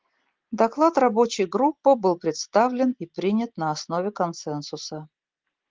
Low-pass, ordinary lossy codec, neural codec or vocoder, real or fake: 7.2 kHz; Opus, 16 kbps; none; real